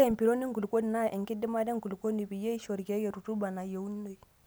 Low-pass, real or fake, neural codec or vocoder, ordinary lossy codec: none; real; none; none